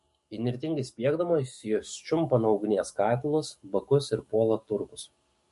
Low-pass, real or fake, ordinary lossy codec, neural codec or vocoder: 14.4 kHz; fake; MP3, 48 kbps; codec, 44.1 kHz, 7.8 kbps, DAC